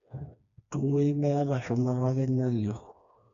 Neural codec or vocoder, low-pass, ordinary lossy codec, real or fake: codec, 16 kHz, 2 kbps, FreqCodec, smaller model; 7.2 kHz; none; fake